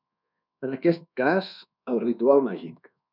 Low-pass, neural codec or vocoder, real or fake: 5.4 kHz; codec, 24 kHz, 1.2 kbps, DualCodec; fake